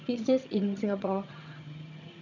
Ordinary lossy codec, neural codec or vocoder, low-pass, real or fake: none; vocoder, 22.05 kHz, 80 mel bands, HiFi-GAN; 7.2 kHz; fake